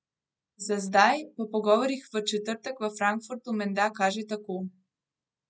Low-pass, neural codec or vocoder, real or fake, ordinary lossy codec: none; none; real; none